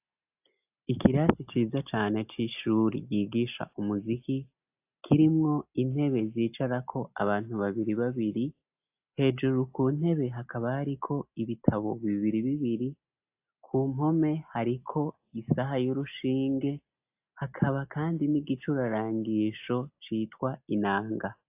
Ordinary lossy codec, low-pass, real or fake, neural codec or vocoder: AAC, 32 kbps; 3.6 kHz; real; none